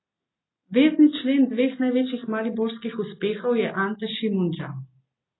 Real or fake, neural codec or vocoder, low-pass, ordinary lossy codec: real; none; 7.2 kHz; AAC, 16 kbps